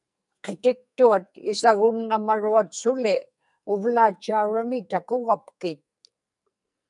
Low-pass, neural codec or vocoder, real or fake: 10.8 kHz; codec, 44.1 kHz, 2.6 kbps, SNAC; fake